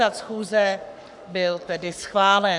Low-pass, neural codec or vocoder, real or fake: 10.8 kHz; codec, 44.1 kHz, 7.8 kbps, Pupu-Codec; fake